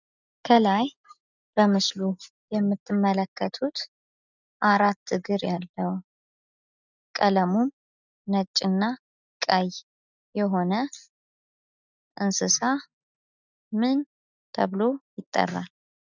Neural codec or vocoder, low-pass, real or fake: none; 7.2 kHz; real